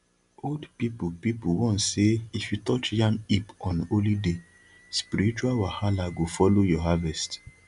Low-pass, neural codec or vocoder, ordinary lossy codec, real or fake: 10.8 kHz; none; none; real